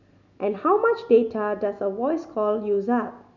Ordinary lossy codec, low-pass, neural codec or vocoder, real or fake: none; 7.2 kHz; none; real